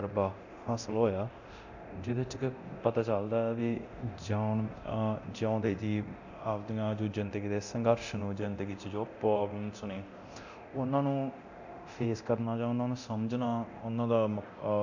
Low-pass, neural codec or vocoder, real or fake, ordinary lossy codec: 7.2 kHz; codec, 24 kHz, 0.9 kbps, DualCodec; fake; none